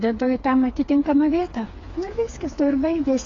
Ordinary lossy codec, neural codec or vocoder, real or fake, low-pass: AAC, 32 kbps; codec, 16 kHz, 4 kbps, FreqCodec, smaller model; fake; 7.2 kHz